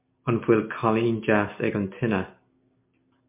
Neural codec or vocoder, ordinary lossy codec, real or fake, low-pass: none; MP3, 32 kbps; real; 3.6 kHz